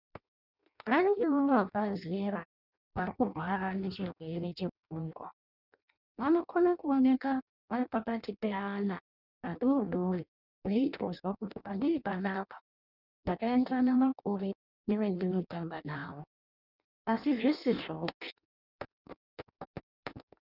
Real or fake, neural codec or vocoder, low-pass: fake; codec, 16 kHz in and 24 kHz out, 0.6 kbps, FireRedTTS-2 codec; 5.4 kHz